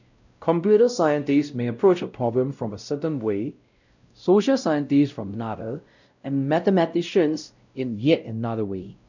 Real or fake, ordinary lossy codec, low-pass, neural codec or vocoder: fake; none; 7.2 kHz; codec, 16 kHz, 0.5 kbps, X-Codec, WavLM features, trained on Multilingual LibriSpeech